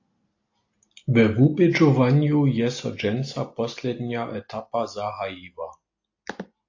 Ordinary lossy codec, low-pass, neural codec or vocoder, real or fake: AAC, 48 kbps; 7.2 kHz; none; real